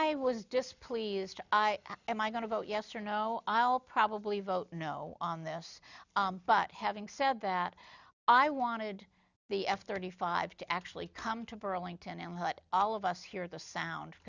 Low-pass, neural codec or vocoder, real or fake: 7.2 kHz; none; real